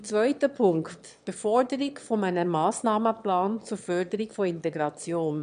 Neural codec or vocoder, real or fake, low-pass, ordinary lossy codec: autoencoder, 22.05 kHz, a latent of 192 numbers a frame, VITS, trained on one speaker; fake; 9.9 kHz; none